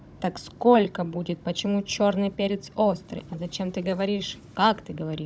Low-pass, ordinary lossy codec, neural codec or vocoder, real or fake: none; none; codec, 16 kHz, 16 kbps, FunCodec, trained on Chinese and English, 50 frames a second; fake